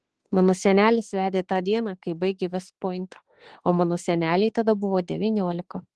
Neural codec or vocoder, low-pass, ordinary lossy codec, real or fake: autoencoder, 48 kHz, 32 numbers a frame, DAC-VAE, trained on Japanese speech; 10.8 kHz; Opus, 16 kbps; fake